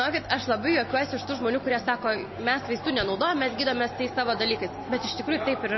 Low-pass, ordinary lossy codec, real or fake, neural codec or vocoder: 7.2 kHz; MP3, 24 kbps; real; none